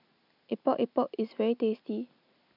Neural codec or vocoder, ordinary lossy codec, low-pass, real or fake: none; none; 5.4 kHz; real